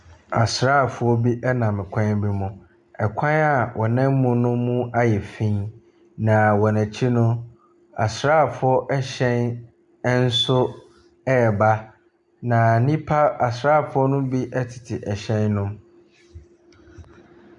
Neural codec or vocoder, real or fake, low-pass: none; real; 10.8 kHz